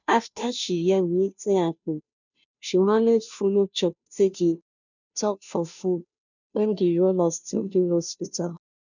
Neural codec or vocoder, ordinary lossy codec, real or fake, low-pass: codec, 16 kHz, 0.5 kbps, FunCodec, trained on Chinese and English, 25 frames a second; none; fake; 7.2 kHz